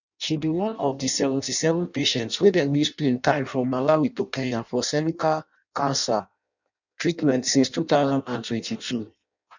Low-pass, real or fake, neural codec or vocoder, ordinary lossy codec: 7.2 kHz; fake; codec, 16 kHz in and 24 kHz out, 0.6 kbps, FireRedTTS-2 codec; none